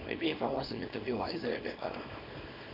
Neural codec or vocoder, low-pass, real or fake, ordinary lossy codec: codec, 24 kHz, 0.9 kbps, WavTokenizer, small release; 5.4 kHz; fake; none